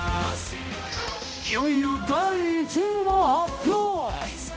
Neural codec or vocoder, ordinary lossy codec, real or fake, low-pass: codec, 16 kHz, 0.5 kbps, X-Codec, HuBERT features, trained on balanced general audio; none; fake; none